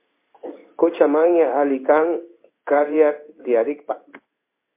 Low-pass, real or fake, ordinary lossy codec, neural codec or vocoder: 3.6 kHz; fake; MP3, 24 kbps; codec, 16 kHz in and 24 kHz out, 1 kbps, XY-Tokenizer